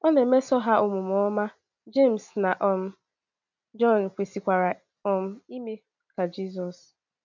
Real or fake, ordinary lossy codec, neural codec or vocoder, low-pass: real; none; none; 7.2 kHz